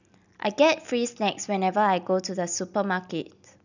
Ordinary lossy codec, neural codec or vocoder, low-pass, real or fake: none; none; 7.2 kHz; real